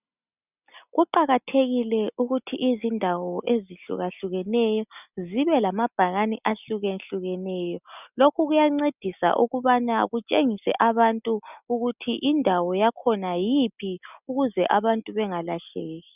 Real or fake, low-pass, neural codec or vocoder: real; 3.6 kHz; none